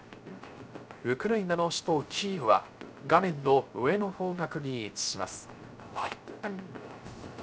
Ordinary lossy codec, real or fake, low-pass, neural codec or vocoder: none; fake; none; codec, 16 kHz, 0.3 kbps, FocalCodec